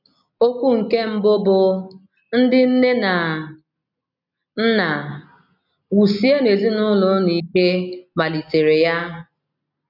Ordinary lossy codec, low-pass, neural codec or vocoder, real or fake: none; 5.4 kHz; none; real